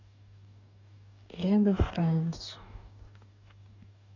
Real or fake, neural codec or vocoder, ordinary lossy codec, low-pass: fake; codec, 44.1 kHz, 2.6 kbps, DAC; none; 7.2 kHz